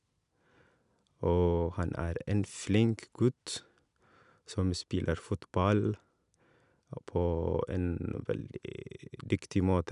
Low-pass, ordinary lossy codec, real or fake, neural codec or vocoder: 10.8 kHz; none; real; none